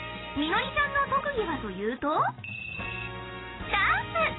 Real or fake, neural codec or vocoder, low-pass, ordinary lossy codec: real; none; 7.2 kHz; AAC, 16 kbps